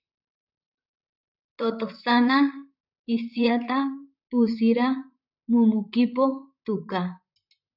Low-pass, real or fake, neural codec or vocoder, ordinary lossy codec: 5.4 kHz; fake; vocoder, 44.1 kHz, 128 mel bands, Pupu-Vocoder; AAC, 48 kbps